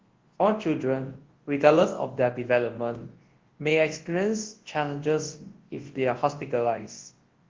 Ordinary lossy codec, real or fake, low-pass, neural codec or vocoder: Opus, 16 kbps; fake; 7.2 kHz; codec, 24 kHz, 0.9 kbps, WavTokenizer, large speech release